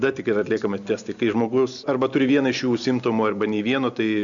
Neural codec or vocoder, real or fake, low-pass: none; real; 7.2 kHz